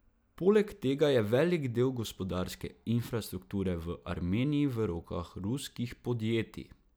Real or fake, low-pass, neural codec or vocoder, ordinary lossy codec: real; none; none; none